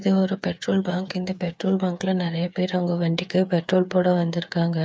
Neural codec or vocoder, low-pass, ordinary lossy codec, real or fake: codec, 16 kHz, 8 kbps, FreqCodec, smaller model; none; none; fake